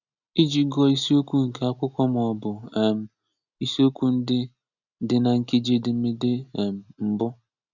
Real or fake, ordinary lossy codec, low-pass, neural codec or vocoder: real; none; 7.2 kHz; none